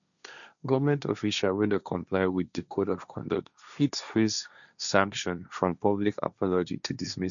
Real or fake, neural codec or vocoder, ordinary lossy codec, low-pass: fake; codec, 16 kHz, 1.1 kbps, Voila-Tokenizer; none; 7.2 kHz